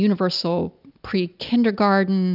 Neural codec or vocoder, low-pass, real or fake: none; 5.4 kHz; real